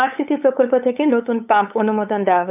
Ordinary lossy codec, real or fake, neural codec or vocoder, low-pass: none; fake; codec, 16 kHz, 8 kbps, FunCodec, trained on LibriTTS, 25 frames a second; 3.6 kHz